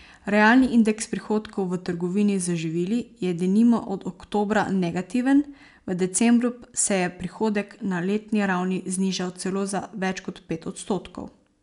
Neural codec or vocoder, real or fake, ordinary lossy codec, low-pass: none; real; none; 10.8 kHz